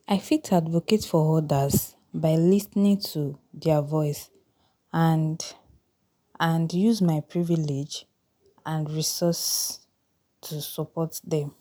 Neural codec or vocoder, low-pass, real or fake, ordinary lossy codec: none; none; real; none